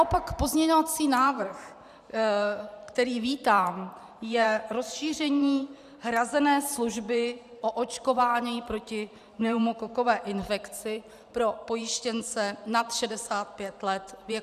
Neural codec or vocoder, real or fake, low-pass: vocoder, 44.1 kHz, 128 mel bands every 512 samples, BigVGAN v2; fake; 14.4 kHz